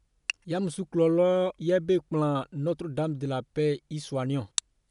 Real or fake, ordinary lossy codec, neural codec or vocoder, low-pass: real; none; none; 10.8 kHz